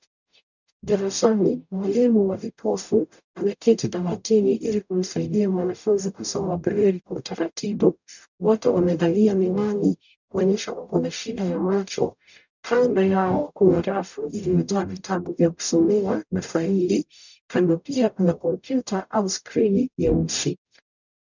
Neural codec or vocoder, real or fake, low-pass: codec, 44.1 kHz, 0.9 kbps, DAC; fake; 7.2 kHz